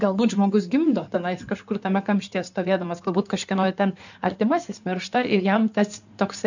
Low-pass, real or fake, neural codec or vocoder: 7.2 kHz; fake; codec, 16 kHz in and 24 kHz out, 2.2 kbps, FireRedTTS-2 codec